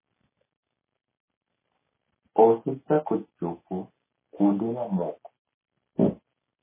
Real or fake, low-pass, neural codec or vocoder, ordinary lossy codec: real; 3.6 kHz; none; MP3, 16 kbps